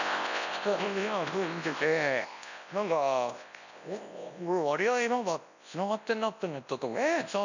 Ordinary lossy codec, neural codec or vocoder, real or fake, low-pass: none; codec, 24 kHz, 0.9 kbps, WavTokenizer, large speech release; fake; 7.2 kHz